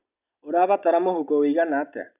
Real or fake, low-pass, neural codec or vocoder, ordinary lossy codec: real; 3.6 kHz; none; none